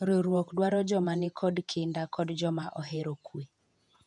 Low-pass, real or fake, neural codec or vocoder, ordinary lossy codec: 10.8 kHz; fake; vocoder, 48 kHz, 128 mel bands, Vocos; none